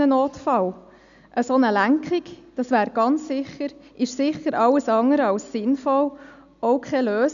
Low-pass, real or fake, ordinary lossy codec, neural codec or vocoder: 7.2 kHz; real; none; none